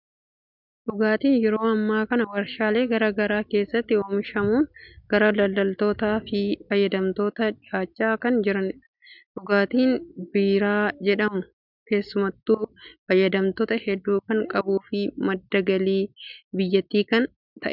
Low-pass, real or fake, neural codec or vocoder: 5.4 kHz; real; none